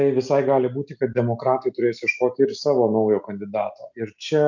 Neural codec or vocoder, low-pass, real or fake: none; 7.2 kHz; real